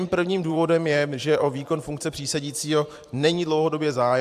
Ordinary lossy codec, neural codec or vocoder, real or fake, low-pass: AAC, 96 kbps; vocoder, 44.1 kHz, 128 mel bands every 512 samples, BigVGAN v2; fake; 14.4 kHz